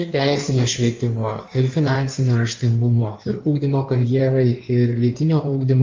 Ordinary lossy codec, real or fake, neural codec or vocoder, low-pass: Opus, 32 kbps; fake; codec, 16 kHz in and 24 kHz out, 1.1 kbps, FireRedTTS-2 codec; 7.2 kHz